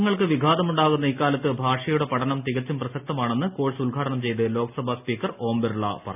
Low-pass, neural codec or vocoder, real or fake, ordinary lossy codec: 3.6 kHz; none; real; none